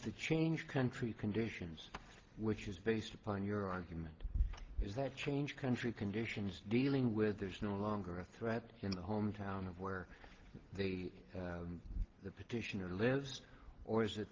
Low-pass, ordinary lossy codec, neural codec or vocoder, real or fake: 7.2 kHz; Opus, 16 kbps; none; real